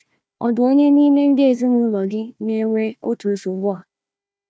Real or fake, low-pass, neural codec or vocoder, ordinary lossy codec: fake; none; codec, 16 kHz, 1 kbps, FunCodec, trained on Chinese and English, 50 frames a second; none